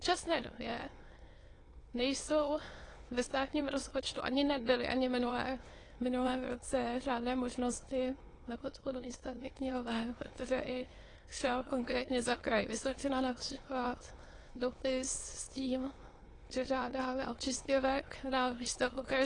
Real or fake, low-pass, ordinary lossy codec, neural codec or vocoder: fake; 9.9 kHz; AAC, 32 kbps; autoencoder, 22.05 kHz, a latent of 192 numbers a frame, VITS, trained on many speakers